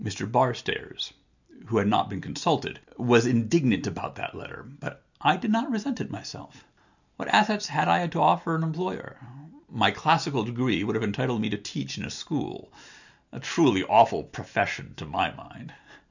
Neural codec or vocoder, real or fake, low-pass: none; real; 7.2 kHz